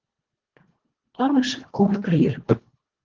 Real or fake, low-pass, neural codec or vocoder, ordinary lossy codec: fake; 7.2 kHz; codec, 24 kHz, 1.5 kbps, HILCodec; Opus, 16 kbps